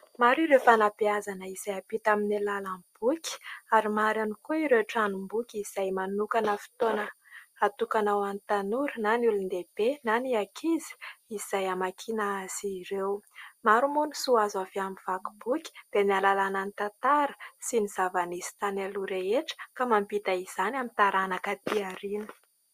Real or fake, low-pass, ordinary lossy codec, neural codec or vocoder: real; 14.4 kHz; Opus, 64 kbps; none